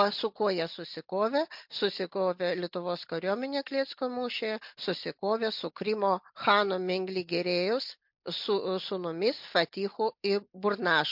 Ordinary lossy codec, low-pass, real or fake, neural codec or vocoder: MP3, 48 kbps; 5.4 kHz; real; none